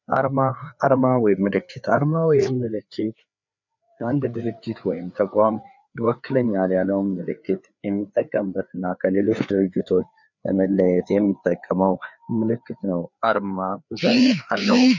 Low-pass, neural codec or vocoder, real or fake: 7.2 kHz; codec, 16 kHz, 4 kbps, FreqCodec, larger model; fake